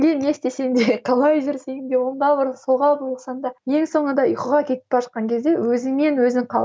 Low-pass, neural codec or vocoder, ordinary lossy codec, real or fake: none; none; none; real